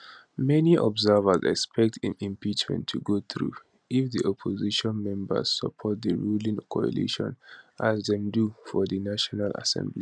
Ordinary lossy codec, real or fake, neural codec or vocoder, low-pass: none; real; none; 9.9 kHz